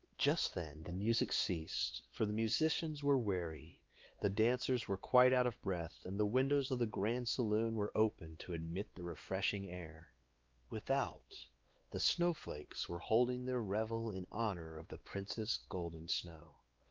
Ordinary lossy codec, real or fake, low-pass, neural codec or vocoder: Opus, 24 kbps; fake; 7.2 kHz; codec, 16 kHz, 2 kbps, X-Codec, WavLM features, trained on Multilingual LibriSpeech